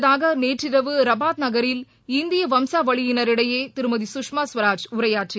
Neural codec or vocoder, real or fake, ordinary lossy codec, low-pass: none; real; none; none